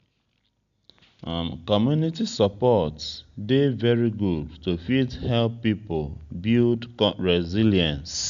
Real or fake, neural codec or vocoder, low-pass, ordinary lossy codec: real; none; 7.2 kHz; none